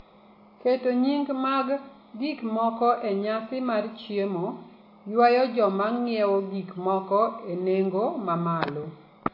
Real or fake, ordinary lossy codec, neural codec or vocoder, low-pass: real; AAC, 32 kbps; none; 5.4 kHz